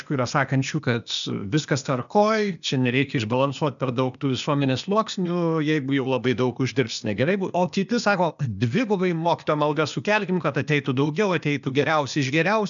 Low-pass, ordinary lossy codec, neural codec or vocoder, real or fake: 7.2 kHz; MP3, 96 kbps; codec, 16 kHz, 0.8 kbps, ZipCodec; fake